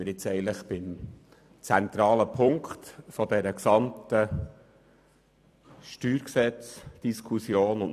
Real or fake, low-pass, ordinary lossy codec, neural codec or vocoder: fake; 14.4 kHz; AAC, 96 kbps; vocoder, 44.1 kHz, 128 mel bands every 256 samples, BigVGAN v2